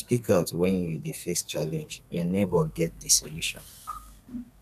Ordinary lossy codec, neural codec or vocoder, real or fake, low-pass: none; codec, 32 kHz, 1.9 kbps, SNAC; fake; 14.4 kHz